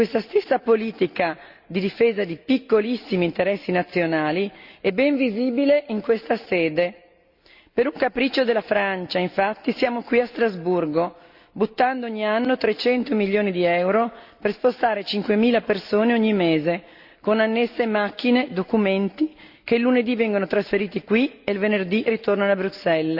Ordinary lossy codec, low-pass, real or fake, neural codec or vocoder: Opus, 64 kbps; 5.4 kHz; real; none